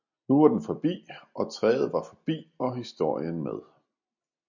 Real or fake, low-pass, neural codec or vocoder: real; 7.2 kHz; none